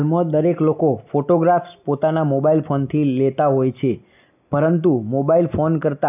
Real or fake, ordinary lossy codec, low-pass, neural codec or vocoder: real; none; 3.6 kHz; none